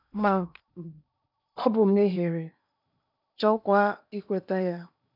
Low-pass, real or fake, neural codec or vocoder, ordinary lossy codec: 5.4 kHz; fake; codec, 16 kHz in and 24 kHz out, 0.8 kbps, FocalCodec, streaming, 65536 codes; none